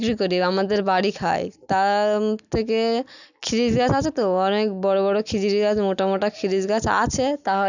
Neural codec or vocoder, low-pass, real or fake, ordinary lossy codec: none; 7.2 kHz; real; none